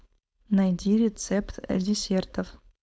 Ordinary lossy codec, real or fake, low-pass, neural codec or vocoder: none; fake; none; codec, 16 kHz, 4.8 kbps, FACodec